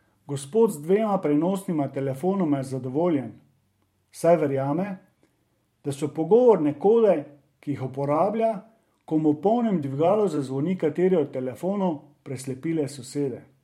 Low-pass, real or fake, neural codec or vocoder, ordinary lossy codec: 19.8 kHz; fake; vocoder, 44.1 kHz, 128 mel bands every 512 samples, BigVGAN v2; MP3, 64 kbps